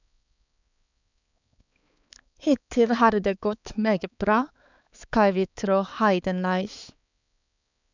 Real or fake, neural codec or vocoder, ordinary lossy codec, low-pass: fake; codec, 16 kHz, 4 kbps, X-Codec, HuBERT features, trained on balanced general audio; none; 7.2 kHz